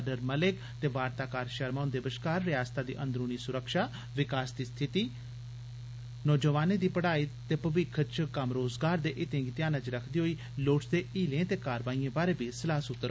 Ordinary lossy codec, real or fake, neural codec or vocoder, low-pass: none; real; none; none